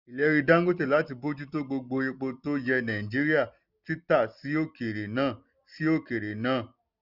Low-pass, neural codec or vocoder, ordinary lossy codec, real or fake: 5.4 kHz; none; none; real